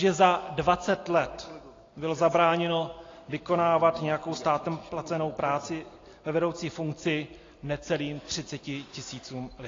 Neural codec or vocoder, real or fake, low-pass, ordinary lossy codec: none; real; 7.2 kHz; AAC, 32 kbps